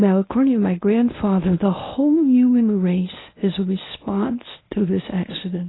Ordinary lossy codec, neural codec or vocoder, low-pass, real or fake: AAC, 16 kbps; codec, 24 kHz, 0.9 kbps, WavTokenizer, medium speech release version 1; 7.2 kHz; fake